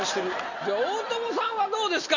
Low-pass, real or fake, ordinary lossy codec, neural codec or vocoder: 7.2 kHz; real; none; none